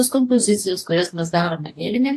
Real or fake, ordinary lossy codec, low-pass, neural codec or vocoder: fake; AAC, 64 kbps; 14.4 kHz; codec, 44.1 kHz, 2.6 kbps, DAC